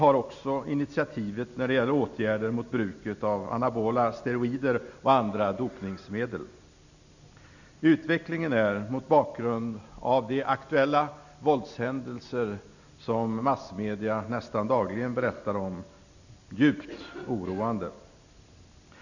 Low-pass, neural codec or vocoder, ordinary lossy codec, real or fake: 7.2 kHz; none; none; real